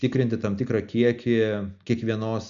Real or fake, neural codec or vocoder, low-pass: real; none; 7.2 kHz